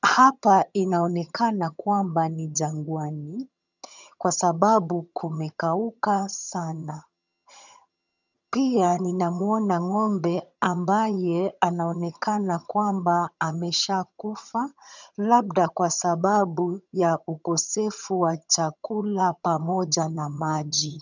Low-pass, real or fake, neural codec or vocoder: 7.2 kHz; fake; vocoder, 22.05 kHz, 80 mel bands, HiFi-GAN